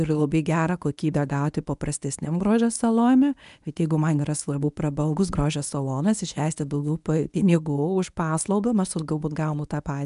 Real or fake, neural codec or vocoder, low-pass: fake; codec, 24 kHz, 0.9 kbps, WavTokenizer, medium speech release version 1; 10.8 kHz